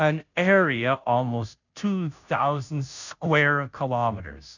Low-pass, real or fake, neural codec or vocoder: 7.2 kHz; fake; codec, 16 kHz, 0.5 kbps, FunCodec, trained on Chinese and English, 25 frames a second